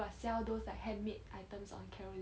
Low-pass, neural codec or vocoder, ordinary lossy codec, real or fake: none; none; none; real